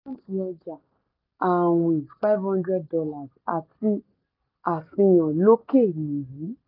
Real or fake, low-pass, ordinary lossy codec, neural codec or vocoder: real; 5.4 kHz; none; none